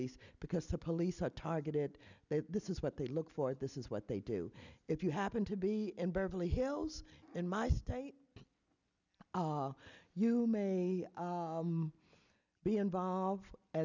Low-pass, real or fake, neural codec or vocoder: 7.2 kHz; real; none